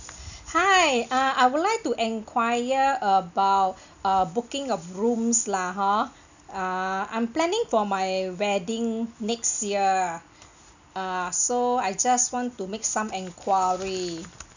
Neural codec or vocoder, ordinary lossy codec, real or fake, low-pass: none; none; real; 7.2 kHz